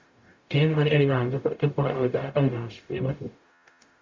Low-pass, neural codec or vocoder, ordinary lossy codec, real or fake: 7.2 kHz; codec, 44.1 kHz, 0.9 kbps, DAC; MP3, 64 kbps; fake